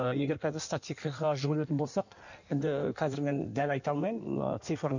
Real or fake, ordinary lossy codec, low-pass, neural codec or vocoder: fake; none; 7.2 kHz; codec, 16 kHz in and 24 kHz out, 1.1 kbps, FireRedTTS-2 codec